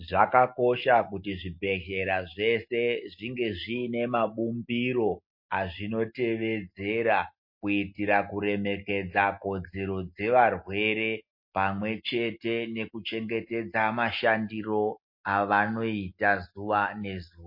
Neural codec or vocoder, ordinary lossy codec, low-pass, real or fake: none; MP3, 32 kbps; 5.4 kHz; real